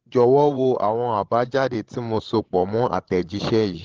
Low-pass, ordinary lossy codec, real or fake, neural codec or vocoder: 7.2 kHz; Opus, 16 kbps; fake; codec, 16 kHz, 16 kbps, FreqCodec, larger model